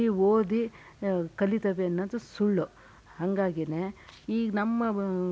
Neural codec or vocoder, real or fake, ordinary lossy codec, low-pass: none; real; none; none